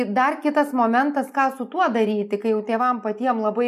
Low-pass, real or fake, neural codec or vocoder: 14.4 kHz; real; none